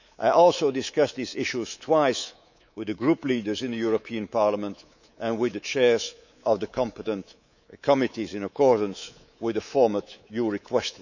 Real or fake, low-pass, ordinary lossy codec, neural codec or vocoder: fake; 7.2 kHz; none; codec, 24 kHz, 3.1 kbps, DualCodec